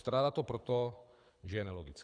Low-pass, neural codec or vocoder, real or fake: 9.9 kHz; codec, 24 kHz, 6 kbps, HILCodec; fake